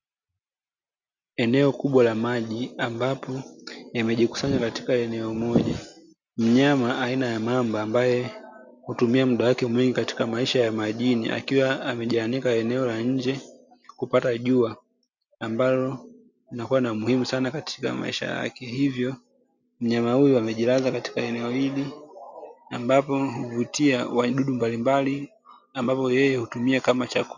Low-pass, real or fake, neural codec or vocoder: 7.2 kHz; real; none